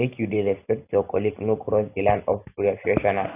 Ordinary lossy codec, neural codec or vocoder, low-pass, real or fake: AAC, 24 kbps; none; 3.6 kHz; real